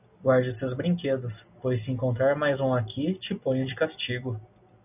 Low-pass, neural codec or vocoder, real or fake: 3.6 kHz; none; real